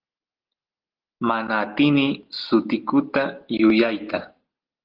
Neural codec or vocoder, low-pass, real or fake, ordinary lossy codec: none; 5.4 kHz; real; Opus, 16 kbps